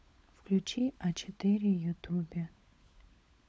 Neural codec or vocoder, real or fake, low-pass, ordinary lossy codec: codec, 16 kHz, 16 kbps, FunCodec, trained on LibriTTS, 50 frames a second; fake; none; none